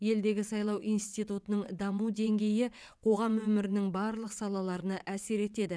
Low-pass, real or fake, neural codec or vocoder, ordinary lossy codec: none; fake; vocoder, 22.05 kHz, 80 mel bands, WaveNeXt; none